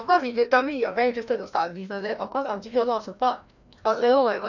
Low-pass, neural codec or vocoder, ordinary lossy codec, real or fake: 7.2 kHz; codec, 16 kHz, 1 kbps, FreqCodec, larger model; none; fake